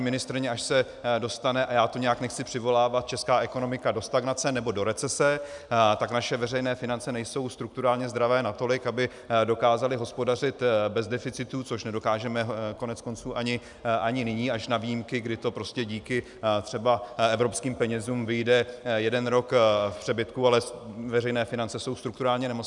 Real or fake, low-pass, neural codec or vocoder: real; 10.8 kHz; none